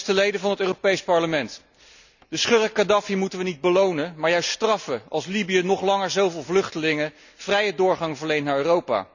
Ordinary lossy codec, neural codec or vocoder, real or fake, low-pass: none; none; real; 7.2 kHz